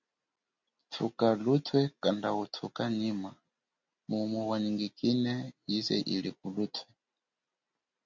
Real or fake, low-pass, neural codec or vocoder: real; 7.2 kHz; none